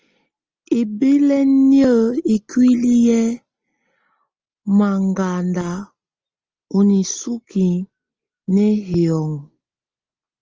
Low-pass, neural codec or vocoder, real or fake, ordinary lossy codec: 7.2 kHz; none; real; Opus, 24 kbps